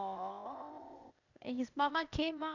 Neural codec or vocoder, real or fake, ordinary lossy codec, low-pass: codec, 16 kHz, 0.8 kbps, ZipCodec; fake; none; 7.2 kHz